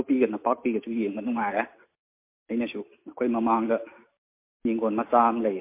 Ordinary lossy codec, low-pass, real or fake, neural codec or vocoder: MP3, 24 kbps; 3.6 kHz; real; none